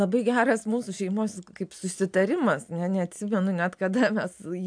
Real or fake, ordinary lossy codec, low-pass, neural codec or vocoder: real; AAC, 64 kbps; 9.9 kHz; none